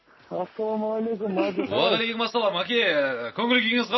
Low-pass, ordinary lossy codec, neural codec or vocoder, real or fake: 7.2 kHz; MP3, 24 kbps; none; real